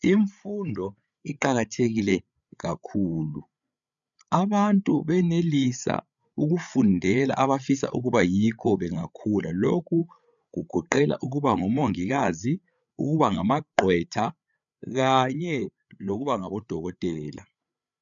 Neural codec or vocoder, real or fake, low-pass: codec, 16 kHz, 8 kbps, FreqCodec, larger model; fake; 7.2 kHz